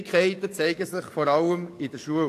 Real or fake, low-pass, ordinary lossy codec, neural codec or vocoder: fake; 14.4 kHz; AAC, 64 kbps; codec, 44.1 kHz, 7.8 kbps, DAC